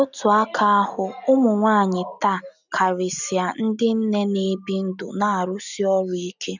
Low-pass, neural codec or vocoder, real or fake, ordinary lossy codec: 7.2 kHz; none; real; none